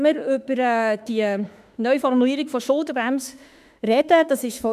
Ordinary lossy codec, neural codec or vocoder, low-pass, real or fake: none; autoencoder, 48 kHz, 32 numbers a frame, DAC-VAE, trained on Japanese speech; 14.4 kHz; fake